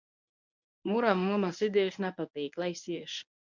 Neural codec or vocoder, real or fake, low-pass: codec, 24 kHz, 0.9 kbps, WavTokenizer, medium speech release version 1; fake; 7.2 kHz